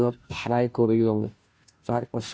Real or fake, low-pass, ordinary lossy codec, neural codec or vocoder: fake; none; none; codec, 16 kHz, 0.5 kbps, FunCodec, trained on Chinese and English, 25 frames a second